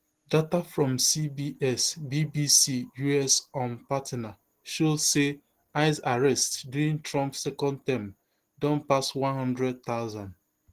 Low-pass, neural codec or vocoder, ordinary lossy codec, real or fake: 14.4 kHz; none; Opus, 16 kbps; real